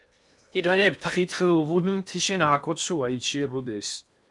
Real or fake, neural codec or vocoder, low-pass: fake; codec, 16 kHz in and 24 kHz out, 0.8 kbps, FocalCodec, streaming, 65536 codes; 10.8 kHz